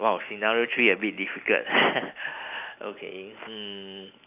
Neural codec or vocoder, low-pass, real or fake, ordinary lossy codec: none; 3.6 kHz; real; AAC, 32 kbps